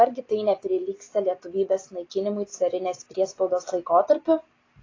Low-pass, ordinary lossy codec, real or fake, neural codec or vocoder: 7.2 kHz; AAC, 32 kbps; real; none